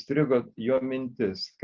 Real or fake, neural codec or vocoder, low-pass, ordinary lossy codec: real; none; 7.2 kHz; Opus, 32 kbps